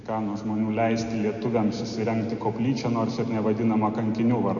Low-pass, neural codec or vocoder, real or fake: 7.2 kHz; none; real